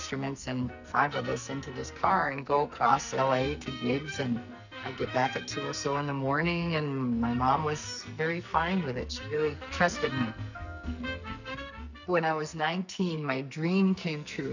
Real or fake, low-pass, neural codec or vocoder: fake; 7.2 kHz; codec, 44.1 kHz, 2.6 kbps, SNAC